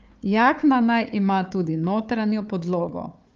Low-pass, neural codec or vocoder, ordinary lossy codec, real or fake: 7.2 kHz; codec, 16 kHz, 4 kbps, FunCodec, trained on Chinese and English, 50 frames a second; Opus, 24 kbps; fake